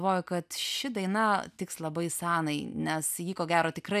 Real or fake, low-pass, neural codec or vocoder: real; 14.4 kHz; none